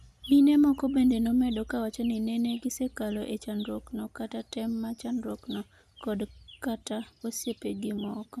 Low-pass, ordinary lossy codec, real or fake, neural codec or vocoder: 14.4 kHz; none; real; none